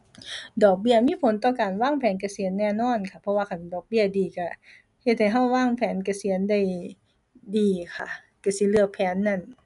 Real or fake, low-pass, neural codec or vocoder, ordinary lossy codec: real; 10.8 kHz; none; none